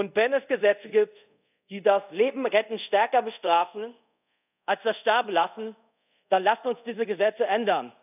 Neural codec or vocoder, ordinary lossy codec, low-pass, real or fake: codec, 24 kHz, 0.5 kbps, DualCodec; none; 3.6 kHz; fake